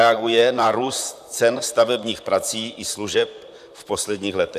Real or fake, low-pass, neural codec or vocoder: fake; 14.4 kHz; vocoder, 44.1 kHz, 128 mel bands, Pupu-Vocoder